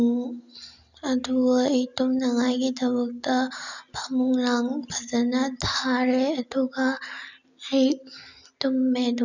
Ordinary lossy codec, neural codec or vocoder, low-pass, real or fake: none; none; 7.2 kHz; real